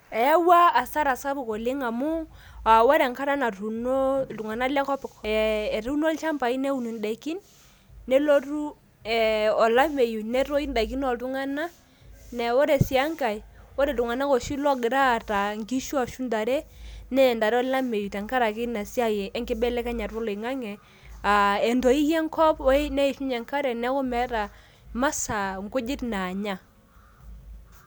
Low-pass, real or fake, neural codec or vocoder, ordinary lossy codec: none; real; none; none